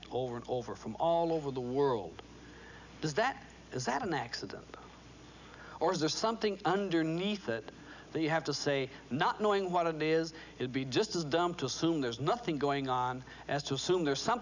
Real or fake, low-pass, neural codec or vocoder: real; 7.2 kHz; none